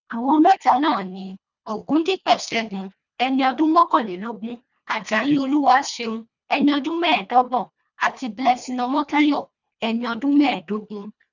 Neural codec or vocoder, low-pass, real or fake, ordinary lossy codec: codec, 24 kHz, 1.5 kbps, HILCodec; 7.2 kHz; fake; none